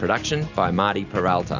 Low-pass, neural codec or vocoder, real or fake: 7.2 kHz; none; real